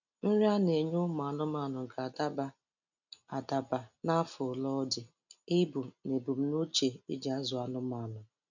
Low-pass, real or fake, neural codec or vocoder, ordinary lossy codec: 7.2 kHz; real; none; none